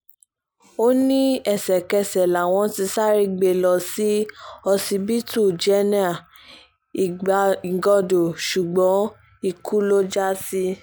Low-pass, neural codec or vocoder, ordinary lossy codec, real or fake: none; none; none; real